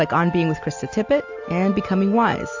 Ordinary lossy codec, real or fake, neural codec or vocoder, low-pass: AAC, 48 kbps; real; none; 7.2 kHz